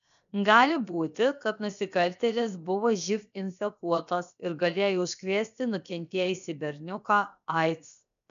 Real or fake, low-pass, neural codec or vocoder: fake; 7.2 kHz; codec, 16 kHz, 0.7 kbps, FocalCodec